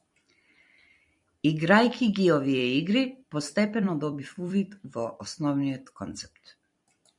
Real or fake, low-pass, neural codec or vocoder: fake; 10.8 kHz; vocoder, 44.1 kHz, 128 mel bands every 256 samples, BigVGAN v2